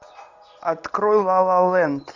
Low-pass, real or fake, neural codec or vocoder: 7.2 kHz; real; none